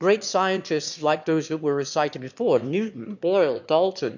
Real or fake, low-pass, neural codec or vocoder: fake; 7.2 kHz; autoencoder, 22.05 kHz, a latent of 192 numbers a frame, VITS, trained on one speaker